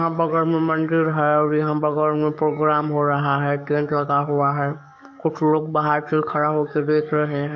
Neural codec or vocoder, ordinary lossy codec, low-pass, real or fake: codec, 44.1 kHz, 7.8 kbps, DAC; MP3, 48 kbps; 7.2 kHz; fake